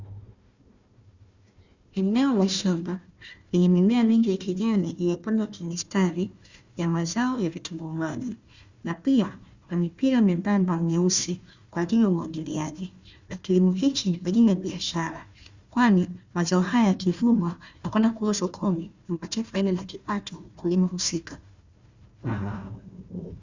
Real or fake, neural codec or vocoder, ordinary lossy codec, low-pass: fake; codec, 16 kHz, 1 kbps, FunCodec, trained on Chinese and English, 50 frames a second; Opus, 64 kbps; 7.2 kHz